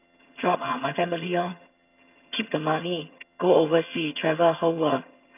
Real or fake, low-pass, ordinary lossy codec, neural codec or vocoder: fake; 3.6 kHz; none; vocoder, 22.05 kHz, 80 mel bands, HiFi-GAN